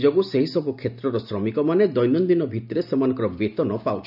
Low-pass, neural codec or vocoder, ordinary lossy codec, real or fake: 5.4 kHz; none; none; real